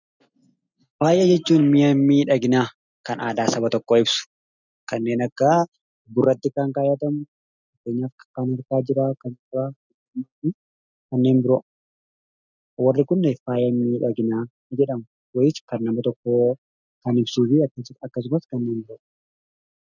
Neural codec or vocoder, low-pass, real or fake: none; 7.2 kHz; real